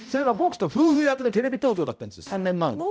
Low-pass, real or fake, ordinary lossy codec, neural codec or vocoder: none; fake; none; codec, 16 kHz, 0.5 kbps, X-Codec, HuBERT features, trained on balanced general audio